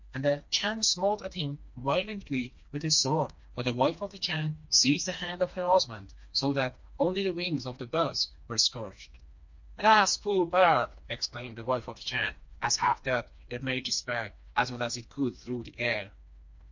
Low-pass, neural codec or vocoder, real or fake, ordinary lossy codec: 7.2 kHz; codec, 16 kHz, 2 kbps, FreqCodec, smaller model; fake; MP3, 48 kbps